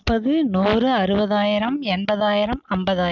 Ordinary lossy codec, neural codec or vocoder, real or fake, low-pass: none; none; real; 7.2 kHz